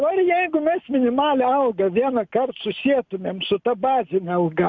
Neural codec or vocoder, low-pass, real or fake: none; 7.2 kHz; real